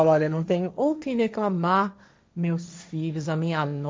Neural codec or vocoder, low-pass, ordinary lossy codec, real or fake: codec, 16 kHz, 1.1 kbps, Voila-Tokenizer; none; none; fake